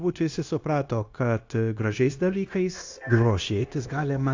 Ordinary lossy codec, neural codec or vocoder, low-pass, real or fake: AAC, 48 kbps; codec, 16 kHz, 0.9 kbps, LongCat-Audio-Codec; 7.2 kHz; fake